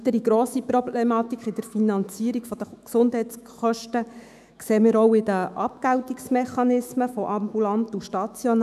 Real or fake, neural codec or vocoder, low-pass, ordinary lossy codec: fake; autoencoder, 48 kHz, 128 numbers a frame, DAC-VAE, trained on Japanese speech; 14.4 kHz; none